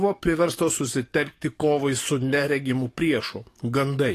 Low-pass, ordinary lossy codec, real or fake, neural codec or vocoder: 14.4 kHz; AAC, 48 kbps; fake; codec, 44.1 kHz, 7.8 kbps, DAC